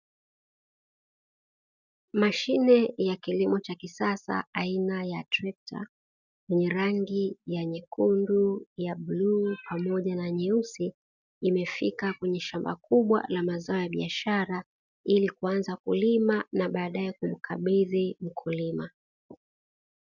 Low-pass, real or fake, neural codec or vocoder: 7.2 kHz; real; none